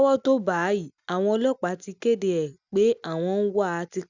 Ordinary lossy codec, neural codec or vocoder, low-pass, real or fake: none; none; 7.2 kHz; real